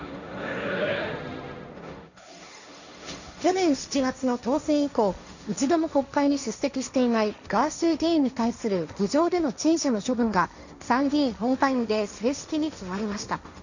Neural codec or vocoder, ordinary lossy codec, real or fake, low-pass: codec, 16 kHz, 1.1 kbps, Voila-Tokenizer; none; fake; 7.2 kHz